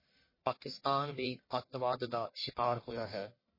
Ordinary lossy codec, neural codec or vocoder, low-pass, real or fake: MP3, 24 kbps; codec, 44.1 kHz, 1.7 kbps, Pupu-Codec; 5.4 kHz; fake